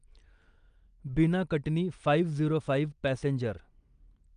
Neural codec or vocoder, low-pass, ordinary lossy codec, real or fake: none; 9.9 kHz; Opus, 32 kbps; real